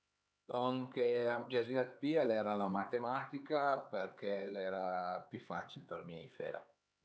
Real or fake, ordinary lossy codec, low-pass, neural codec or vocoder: fake; none; none; codec, 16 kHz, 4 kbps, X-Codec, HuBERT features, trained on LibriSpeech